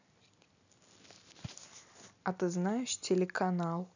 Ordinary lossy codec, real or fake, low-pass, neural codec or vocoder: none; real; 7.2 kHz; none